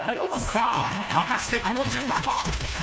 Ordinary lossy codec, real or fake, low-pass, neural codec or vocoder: none; fake; none; codec, 16 kHz, 1 kbps, FunCodec, trained on LibriTTS, 50 frames a second